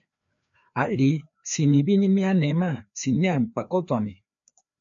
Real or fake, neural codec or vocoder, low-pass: fake; codec, 16 kHz, 2 kbps, FreqCodec, larger model; 7.2 kHz